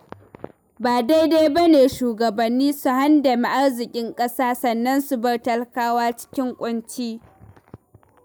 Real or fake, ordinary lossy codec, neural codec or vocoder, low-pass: real; none; none; none